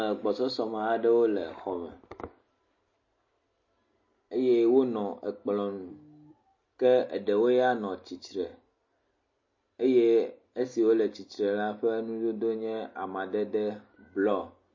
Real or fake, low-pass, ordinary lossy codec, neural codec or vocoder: real; 7.2 kHz; MP3, 32 kbps; none